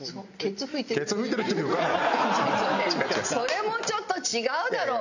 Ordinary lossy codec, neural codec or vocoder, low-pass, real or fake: none; none; 7.2 kHz; real